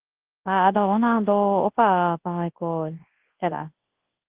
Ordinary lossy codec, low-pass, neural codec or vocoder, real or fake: Opus, 16 kbps; 3.6 kHz; codec, 24 kHz, 0.9 kbps, WavTokenizer, large speech release; fake